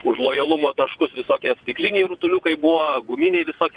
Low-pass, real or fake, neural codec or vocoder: 9.9 kHz; fake; vocoder, 22.05 kHz, 80 mel bands, WaveNeXt